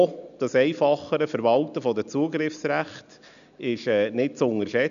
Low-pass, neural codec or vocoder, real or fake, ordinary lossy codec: 7.2 kHz; none; real; none